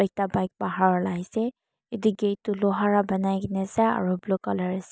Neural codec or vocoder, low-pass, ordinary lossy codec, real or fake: none; none; none; real